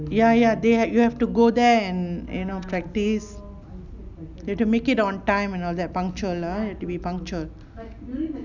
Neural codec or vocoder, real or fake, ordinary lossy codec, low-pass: none; real; none; 7.2 kHz